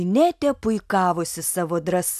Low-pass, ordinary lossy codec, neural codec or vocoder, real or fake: 14.4 kHz; AAC, 96 kbps; none; real